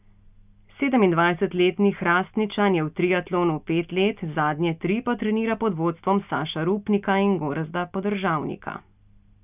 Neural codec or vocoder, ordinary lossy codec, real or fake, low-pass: none; none; real; 3.6 kHz